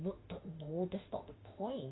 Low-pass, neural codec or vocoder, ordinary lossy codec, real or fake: 7.2 kHz; autoencoder, 48 kHz, 32 numbers a frame, DAC-VAE, trained on Japanese speech; AAC, 16 kbps; fake